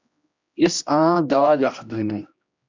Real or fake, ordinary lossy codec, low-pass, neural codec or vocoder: fake; MP3, 64 kbps; 7.2 kHz; codec, 16 kHz, 1 kbps, X-Codec, HuBERT features, trained on general audio